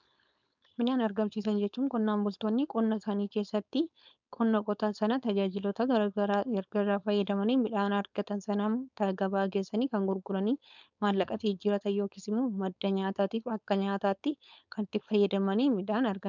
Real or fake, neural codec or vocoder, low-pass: fake; codec, 16 kHz, 4.8 kbps, FACodec; 7.2 kHz